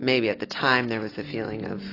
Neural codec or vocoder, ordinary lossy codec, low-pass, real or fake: none; AAC, 24 kbps; 5.4 kHz; real